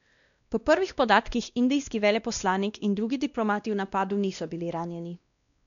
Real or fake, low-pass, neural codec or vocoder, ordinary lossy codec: fake; 7.2 kHz; codec, 16 kHz, 1 kbps, X-Codec, WavLM features, trained on Multilingual LibriSpeech; none